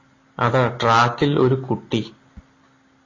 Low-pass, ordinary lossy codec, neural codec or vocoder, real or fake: 7.2 kHz; AAC, 32 kbps; none; real